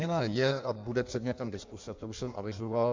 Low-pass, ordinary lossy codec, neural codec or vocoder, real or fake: 7.2 kHz; MP3, 64 kbps; codec, 16 kHz in and 24 kHz out, 1.1 kbps, FireRedTTS-2 codec; fake